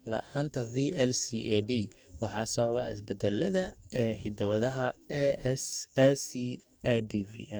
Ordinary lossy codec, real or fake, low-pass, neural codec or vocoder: none; fake; none; codec, 44.1 kHz, 2.6 kbps, DAC